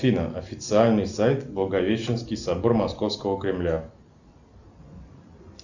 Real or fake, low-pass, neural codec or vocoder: real; 7.2 kHz; none